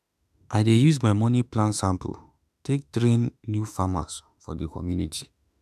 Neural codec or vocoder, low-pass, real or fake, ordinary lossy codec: autoencoder, 48 kHz, 32 numbers a frame, DAC-VAE, trained on Japanese speech; 14.4 kHz; fake; none